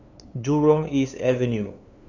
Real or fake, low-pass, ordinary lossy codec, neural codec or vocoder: fake; 7.2 kHz; Opus, 64 kbps; codec, 16 kHz, 2 kbps, FunCodec, trained on LibriTTS, 25 frames a second